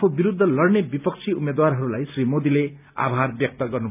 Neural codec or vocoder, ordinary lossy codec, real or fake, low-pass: none; none; real; 3.6 kHz